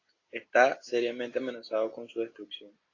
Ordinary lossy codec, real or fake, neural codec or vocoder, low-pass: AAC, 32 kbps; real; none; 7.2 kHz